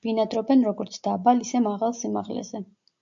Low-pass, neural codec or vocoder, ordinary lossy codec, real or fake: 7.2 kHz; none; AAC, 64 kbps; real